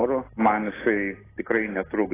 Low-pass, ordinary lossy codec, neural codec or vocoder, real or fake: 3.6 kHz; AAC, 16 kbps; none; real